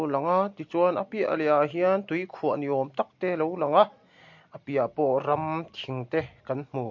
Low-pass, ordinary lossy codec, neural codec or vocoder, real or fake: 7.2 kHz; MP3, 48 kbps; none; real